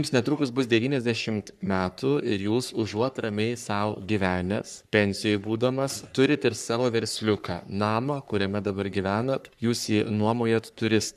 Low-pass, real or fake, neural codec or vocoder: 14.4 kHz; fake; codec, 44.1 kHz, 3.4 kbps, Pupu-Codec